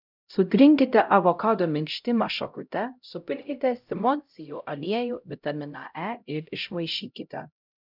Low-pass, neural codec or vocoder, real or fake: 5.4 kHz; codec, 16 kHz, 0.5 kbps, X-Codec, HuBERT features, trained on LibriSpeech; fake